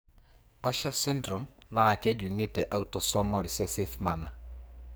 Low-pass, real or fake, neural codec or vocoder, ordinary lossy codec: none; fake; codec, 44.1 kHz, 2.6 kbps, SNAC; none